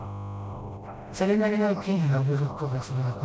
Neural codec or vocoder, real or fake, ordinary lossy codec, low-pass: codec, 16 kHz, 0.5 kbps, FreqCodec, smaller model; fake; none; none